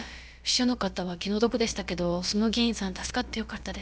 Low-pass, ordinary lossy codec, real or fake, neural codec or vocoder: none; none; fake; codec, 16 kHz, about 1 kbps, DyCAST, with the encoder's durations